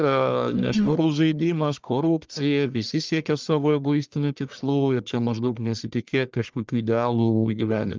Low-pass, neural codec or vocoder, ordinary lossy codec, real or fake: 7.2 kHz; codec, 44.1 kHz, 1.7 kbps, Pupu-Codec; Opus, 24 kbps; fake